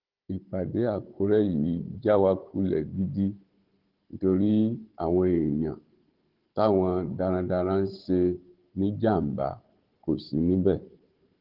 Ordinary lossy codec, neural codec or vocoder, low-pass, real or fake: Opus, 16 kbps; codec, 16 kHz, 16 kbps, FunCodec, trained on Chinese and English, 50 frames a second; 5.4 kHz; fake